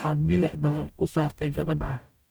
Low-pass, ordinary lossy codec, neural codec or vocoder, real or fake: none; none; codec, 44.1 kHz, 0.9 kbps, DAC; fake